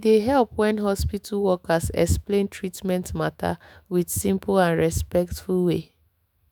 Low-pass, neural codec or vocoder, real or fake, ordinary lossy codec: none; autoencoder, 48 kHz, 128 numbers a frame, DAC-VAE, trained on Japanese speech; fake; none